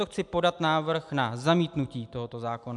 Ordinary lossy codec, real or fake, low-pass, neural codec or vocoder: MP3, 96 kbps; real; 10.8 kHz; none